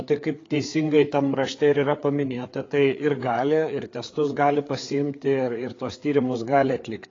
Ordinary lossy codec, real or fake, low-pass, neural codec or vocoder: AAC, 32 kbps; fake; 7.2 kHz; codec, 16 kHz, 4 kbps, FreqCodec, larger model